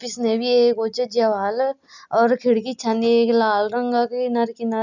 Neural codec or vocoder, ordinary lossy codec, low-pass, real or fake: none; none; 7.2 kHz; real